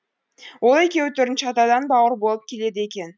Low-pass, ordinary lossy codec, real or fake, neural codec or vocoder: none; none; real; none